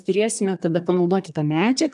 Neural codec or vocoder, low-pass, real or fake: codec, 24 kHz, 1 kbps, SNAC; 10.8 kHz; fake